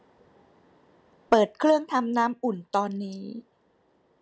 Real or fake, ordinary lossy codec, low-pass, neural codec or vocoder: real; none; none; none